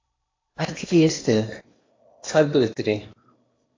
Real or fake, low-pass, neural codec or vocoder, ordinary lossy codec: fake; 7.2 kHz; codec, 16 kHz in and 24 kHz out, 0.8 kbps, FocalCodec, streaming, 65536 codes; AAC, 32 kbps